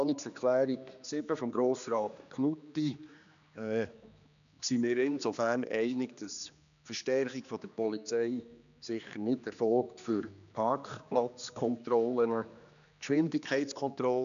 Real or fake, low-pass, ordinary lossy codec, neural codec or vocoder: fake; 7.2 kHz; none; codec, 16 kHz, 2 kbps, X-Codec, HuBERT features, trained on general audio